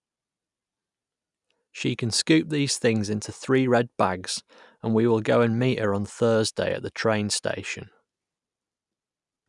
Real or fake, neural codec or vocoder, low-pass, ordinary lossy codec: real; none; 10.8 kHz; none